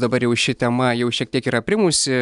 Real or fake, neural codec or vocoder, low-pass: real; none; 10.8 kHz